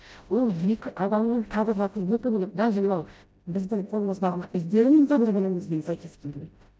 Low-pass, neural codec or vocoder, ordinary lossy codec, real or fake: none; codec, 16 kHz, 0.5 kbps, FreqCodec, smaller model; none; fake